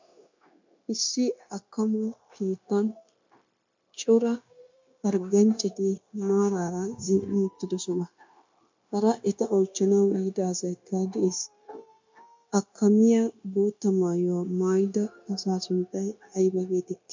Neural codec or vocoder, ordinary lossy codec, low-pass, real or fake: codec, 16 kHz, 0.9 kbps, LongCat-Audio-Codec; MP3, 64 kbps; 7.2 kHz; fake